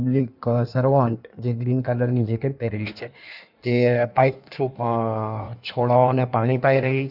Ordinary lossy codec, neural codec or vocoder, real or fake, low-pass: none; codec, 16 kHz in and 24 kHz out, 1.1 kbps, FireRedTTS-2 codec; fake; 5.4 kHz